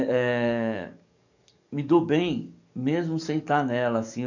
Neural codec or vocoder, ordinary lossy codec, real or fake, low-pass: codec, 44.1 kHz, 7.8 kbps, DAC; none; fake; 7.2 kHz